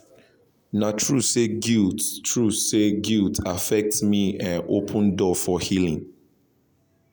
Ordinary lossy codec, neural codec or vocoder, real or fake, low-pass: none; none; real; none